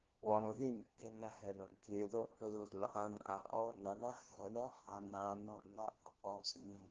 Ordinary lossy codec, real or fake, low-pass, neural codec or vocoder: Opus, 16 kbps; fake; 7.2 kHz; codec, 16 kHz, 1 kbps, FunCodec, trained on LibriTTS, 50 frames a second